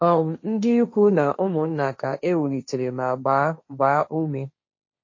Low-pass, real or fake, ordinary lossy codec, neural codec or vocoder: 7.2 kHz; fake; MP3, 32 kbps; codec, 16 kHz, 1.1 kbps, Voila-Tokenizer